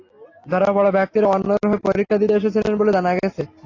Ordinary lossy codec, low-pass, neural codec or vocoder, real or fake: AAC, 32 kbps; 7.2 kHz; none; real